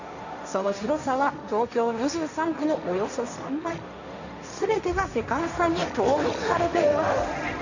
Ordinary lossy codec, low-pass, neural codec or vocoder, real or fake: none; 7.2 kHz; codec, 16 kHz, 1.1 kbps, Voila-Tokenizer; fake